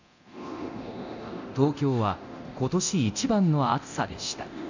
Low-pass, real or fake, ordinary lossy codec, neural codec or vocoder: 7.2 kHz; fake; none; codec, 24 kHz, 0.9 kbps, DualCodec